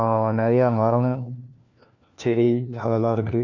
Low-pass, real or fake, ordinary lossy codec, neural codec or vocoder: 7.2 kHz; fake; none; codec, 16 kHz, 1 kbps, FunCodec, trained on LibriTTS, 50 frames a second